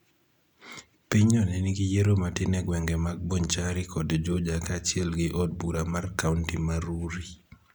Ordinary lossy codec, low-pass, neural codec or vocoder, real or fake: none; 19.8 kHz; none; real